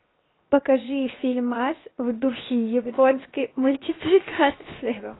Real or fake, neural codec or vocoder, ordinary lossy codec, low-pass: fake; codec, 16 kHz, 0.7 kbps, FocalCodec; AAC, 16 kbps; 7.2 kHz